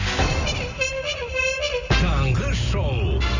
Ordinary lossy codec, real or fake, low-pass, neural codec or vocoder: none; real; 7.2 kHz; none